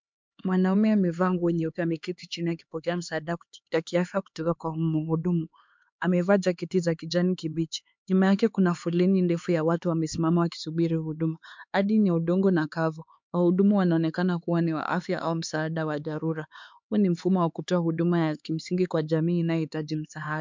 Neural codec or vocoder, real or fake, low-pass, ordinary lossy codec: codec, 16 kHz, 4 kbps, X-Codec, HuBERT features, trained on LibriSpeech; fake; 7.2 kHz; MP3, 64 kbps